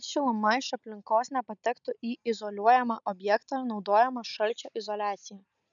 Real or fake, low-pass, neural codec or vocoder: real; 7.2 kHz; none